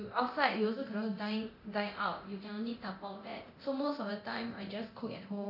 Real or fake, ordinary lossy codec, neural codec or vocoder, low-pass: fake; none; codec, 24 kHz, 0.9 kbps, DualCodec; 5.4 kHz